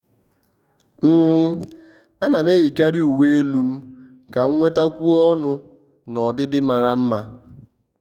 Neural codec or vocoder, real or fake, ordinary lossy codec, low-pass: codec, 44.1 kHz, 2.6 kbps, DAC; fake; none; 19.8 kHz